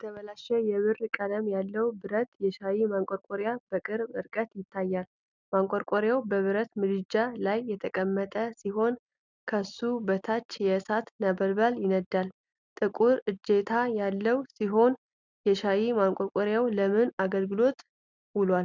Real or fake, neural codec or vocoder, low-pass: real; none; 7.2 kHz